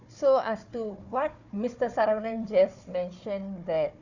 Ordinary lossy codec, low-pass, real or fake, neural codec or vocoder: none; 7.2 kHz; fake; codec, 16 kHz, 4 kbps, FunCodec, trained on Chinese and English, 50 frames a second